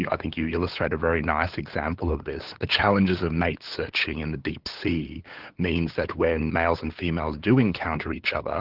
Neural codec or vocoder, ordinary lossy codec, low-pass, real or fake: codec, 16 kHz, 8 kbps, FunCodec, trained on LibriTTS, 25 frames a second; Opus, 16 kbps; 5.4 kHz; fake